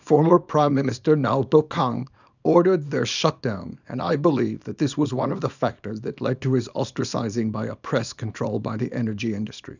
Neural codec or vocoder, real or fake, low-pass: codec, 24 kHz, 0.9 kbps, WavTokenizer, small release; fake; 7.2 kHz